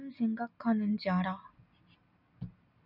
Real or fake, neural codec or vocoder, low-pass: real; none; 5.4 kHz